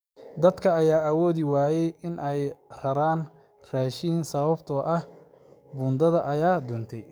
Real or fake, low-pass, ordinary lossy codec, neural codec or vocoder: fake; none; none; codec, 44.1 kHz, 7.8 kbps, DAC